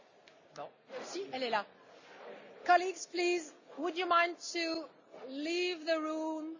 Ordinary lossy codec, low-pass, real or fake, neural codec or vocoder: none; 7.2 kHz; real; none